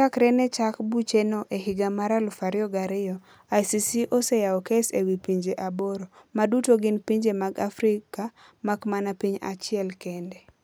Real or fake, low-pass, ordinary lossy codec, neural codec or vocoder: real; none; none; none